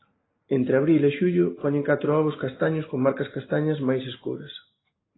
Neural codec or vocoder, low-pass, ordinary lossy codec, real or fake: none; 7.2 kHz; AAC, 16 kbps; real